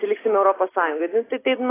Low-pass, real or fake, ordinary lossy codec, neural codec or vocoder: 3.6 kHz; real; AAC, 16 kbps; none